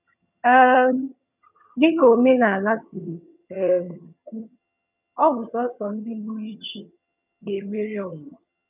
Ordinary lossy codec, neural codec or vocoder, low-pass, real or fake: none; vocoder, 22.05 kHz, 80 mel bands, HiFi-GAN; 3.6 kHz; fake